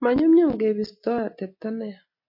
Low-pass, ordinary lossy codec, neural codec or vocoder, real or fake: 5.4 kHz; MP3, 32 kbps; none; real